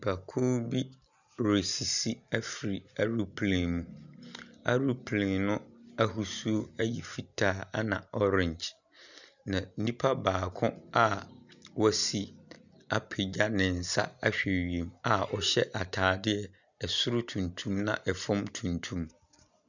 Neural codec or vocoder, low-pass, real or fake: none; 7.2 kHz; real